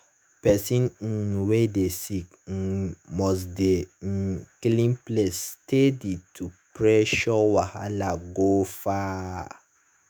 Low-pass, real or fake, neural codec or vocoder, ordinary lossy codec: none; fake; autoencoder, 48 kHz, 128 numbers a frame, DAC-VAE, trained on Japanese speech; none